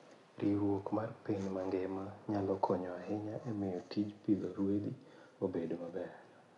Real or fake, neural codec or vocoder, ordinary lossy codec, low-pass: real; none; MP3, 96 kbps; 10.8 kHz